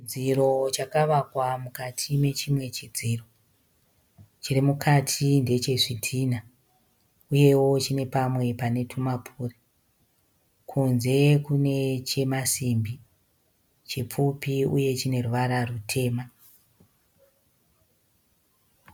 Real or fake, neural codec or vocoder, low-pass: real; none; 19.8 kHz